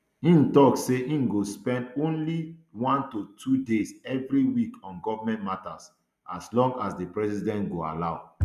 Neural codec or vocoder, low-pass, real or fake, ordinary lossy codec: none; 14.4 kHz; real; none